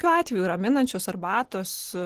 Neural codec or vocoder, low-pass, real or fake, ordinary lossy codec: none; 14.4 kHz; real; Opus, 16 kbps